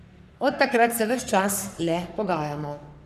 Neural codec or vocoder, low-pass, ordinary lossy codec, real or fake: codec, 44.1 kHz, 3.4 kbps, Pupu-Codec; 14.4 kHz; none; fake